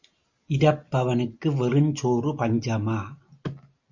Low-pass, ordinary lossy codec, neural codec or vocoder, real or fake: 7.2 kHz; Opus, 64 kbps; none; real